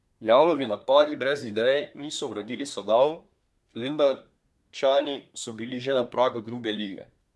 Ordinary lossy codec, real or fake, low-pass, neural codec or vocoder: none; fake; none; codec, 24 kHz, 1 kbps, SNAC